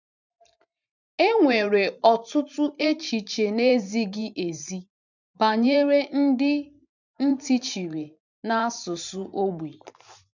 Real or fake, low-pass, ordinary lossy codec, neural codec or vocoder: fake; 7.2 kHz; none; vocoder, 44.1 kHz, 128 mel bands every 512 samples, BigVGAN v2